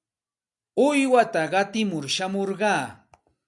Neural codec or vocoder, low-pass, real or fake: none; 10.8 kHz; real